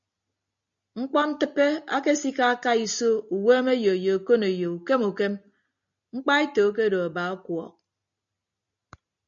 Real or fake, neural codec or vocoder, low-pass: real; none; 7.2 kHz